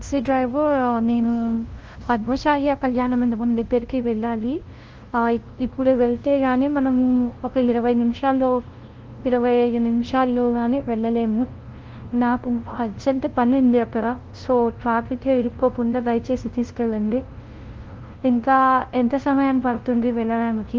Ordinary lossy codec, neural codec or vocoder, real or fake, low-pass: Opus, 16 kbps; codec, 16 kHz, 0.5 kbps, FunCodec, trained on LibriTTS, 25 frames a second; fake; 7.2 kHz